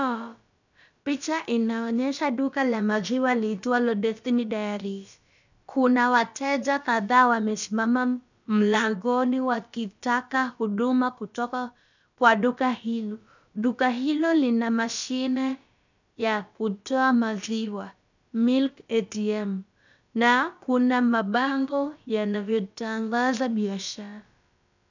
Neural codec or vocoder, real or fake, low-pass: codec, 16 kHz, about 1 kbps, DyCAST, with the encoder's durations; fake; 7.2 kHz